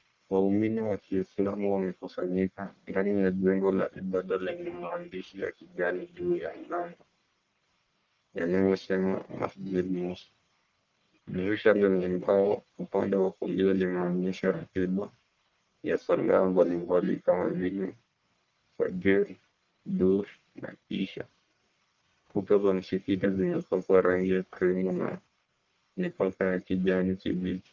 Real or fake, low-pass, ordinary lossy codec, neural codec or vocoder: fake; 7.2 kHz; Opus, 32 kbps; codec, 44.1 kHz, 1.7 kbps, Pupu-Codec